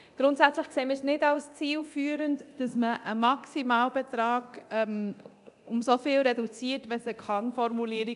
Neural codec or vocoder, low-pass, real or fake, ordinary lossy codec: codec, 24 kHz, 0.9 kbps, DualCodec; 10.8 kHz; fake; AAC, 96 kbps